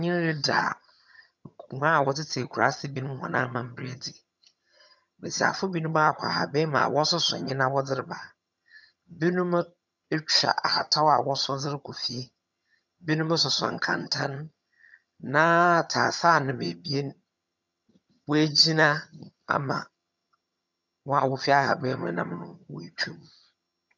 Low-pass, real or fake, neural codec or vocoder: 7.2 kHz; fake; vocoder, 22.05 kHz, 80 mel bands, HiFi-GAN